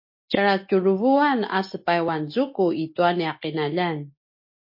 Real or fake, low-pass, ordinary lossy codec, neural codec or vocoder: real; 5.4 kHz; MP3, 32 kbps; none